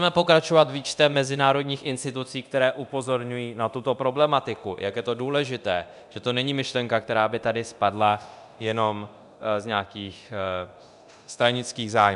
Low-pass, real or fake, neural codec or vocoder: 10.8 kHz; fake; codec, 24 kHz, 0.9 kbps, DualCodec